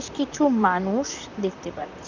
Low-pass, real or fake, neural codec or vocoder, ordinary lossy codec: 7.2 kHz; fake; vocoder, 44.1 kHz, 128 mel bands, Pupu-Vocoder; none